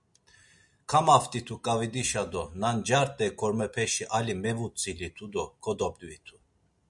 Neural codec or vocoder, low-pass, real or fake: none; 10.8 kHz; real